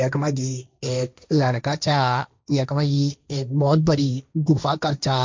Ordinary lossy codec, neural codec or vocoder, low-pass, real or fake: MP3, 64 kbps; codec, 16 kHz, 1.1 kbps, Voila-Tokenizer; 7.2 kHz; fake